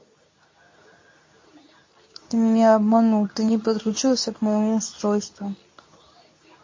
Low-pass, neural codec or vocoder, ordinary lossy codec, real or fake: 7.2 kHz; codec, 24 kHz, 0.9 kbps, WavTokenizer, medium speech release version 2; MP3, 32 kbps; fake